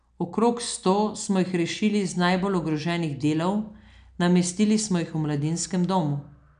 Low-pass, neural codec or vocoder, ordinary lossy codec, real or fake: 9.9 kHz; none; none; real